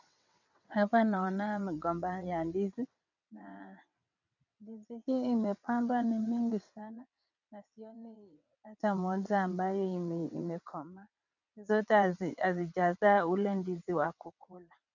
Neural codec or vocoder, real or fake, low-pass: vocoder, 22.05 kHz, 80 mel bands, WaveNeXt; fake; 7.2 kHz